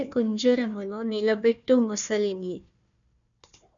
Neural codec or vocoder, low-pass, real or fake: codec, 16 kHz, 1 kbps, FunCodec, trained on Chinese and English, 50 frames a second; 7.2 kHz; fake